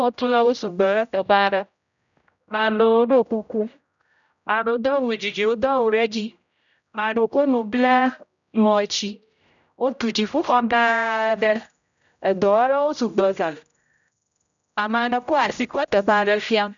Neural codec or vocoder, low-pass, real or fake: codec, 16 kHz, 0.5 kbps, X-Codec, HuBERT features, trained on general audio; 7.2 kHz; fake